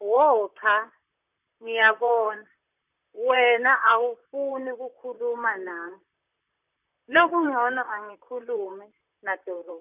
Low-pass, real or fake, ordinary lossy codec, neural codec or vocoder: 3.6 kHz; fake; none; vocoder, 44.1 kHz, 128 mel bands, Pupu-Vocoder